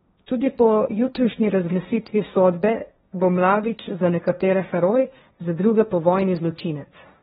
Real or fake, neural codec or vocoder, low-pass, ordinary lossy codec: fake; codec, 16 kHz, 1.1 kbps, Voila-Tokenizer; 7.2 kHz; AAC, 16 kbps